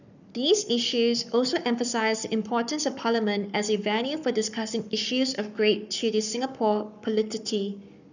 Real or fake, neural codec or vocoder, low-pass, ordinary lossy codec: fake; codec, 44.1 kHz, 7.8 kbps, Pupu-Codec; 7.2 kHz; none